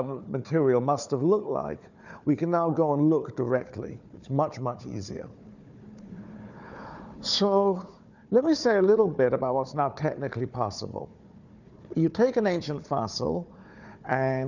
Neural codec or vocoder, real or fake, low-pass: codec, 16 kHz, 4 kbps, FunCodec, trained on Chinese and English, 50 frames a second; fake; 7.2 kHz